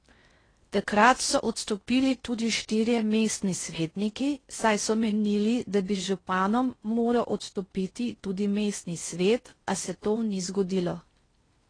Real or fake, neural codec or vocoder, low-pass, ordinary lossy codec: fake; codec, 16 kHz in and 24 kHz out, 0.6 kbps, FocalCodec, streaming, 4096 codes; 9.9 kHz; AAC, 32 kbps